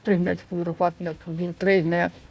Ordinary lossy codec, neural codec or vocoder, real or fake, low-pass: none; codec, 16 kHz, 1 kbps, FunCodec, trained on Chinese and English, 50 frames a second; fake; none